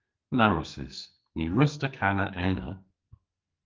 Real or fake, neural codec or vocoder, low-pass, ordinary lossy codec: fake; codec, 44.1 kHz, 2.6 kbps, SNAC; 7.2 kHz; Opus, 32 kbps